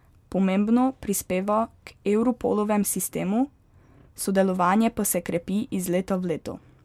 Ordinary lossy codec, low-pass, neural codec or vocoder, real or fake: MP3, 96 kbps; 19.8 kHz; none; real